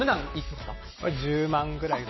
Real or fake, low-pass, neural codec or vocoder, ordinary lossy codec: real; 7.2 kHz; none; MP3, 24 kbps